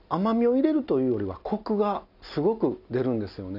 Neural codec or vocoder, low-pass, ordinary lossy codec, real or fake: none; 5.4 kHz; none; real